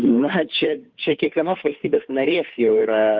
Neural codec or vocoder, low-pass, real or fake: codec, 16 kHz, 2 kbps, FunCodec, trained on Chinese and English, 25 frames a second; 7.2 kHz; fake